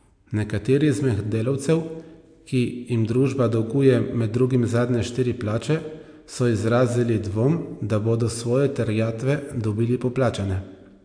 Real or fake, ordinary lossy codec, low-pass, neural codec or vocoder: real; AAC, 64 kbps; 9.9 kHz; none